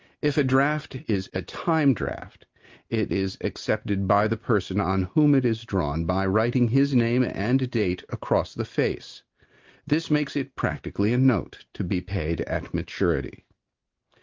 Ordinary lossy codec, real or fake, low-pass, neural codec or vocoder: Opus, 24 kbps; real; 7.2 kHz; none